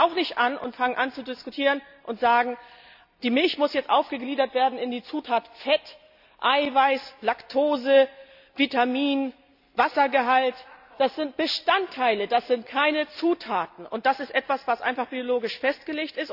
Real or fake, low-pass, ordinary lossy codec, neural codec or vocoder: real; 5.4 kHz; none; none